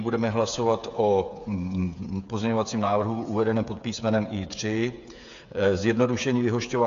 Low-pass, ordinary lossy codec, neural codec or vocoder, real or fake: 7.2 kHz; MP3, 64 kbps; codec, 16 kHz, 8 kbps, FreqCodec, smaller model; fake